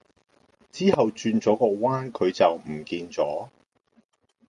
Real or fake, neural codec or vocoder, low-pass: real; none; 10.8 kHz